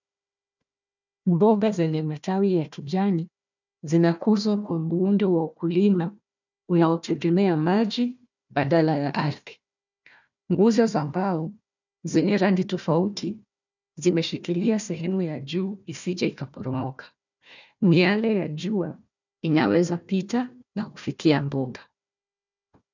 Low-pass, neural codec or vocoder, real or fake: 7.2 kHz; codec, 16 kHz, 1 kbps, FunCodec, trained on Chinese and English, 50 frames a second; fake